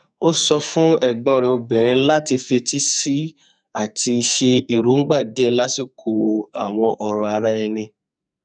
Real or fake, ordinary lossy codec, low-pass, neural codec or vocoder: fake; none; 9.9 kHz; codec, 44.1 kHz, 2.6 kbps, SNAC